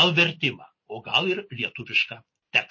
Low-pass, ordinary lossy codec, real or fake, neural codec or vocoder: 7.2 kHz; MP3, 32 kbps; real; none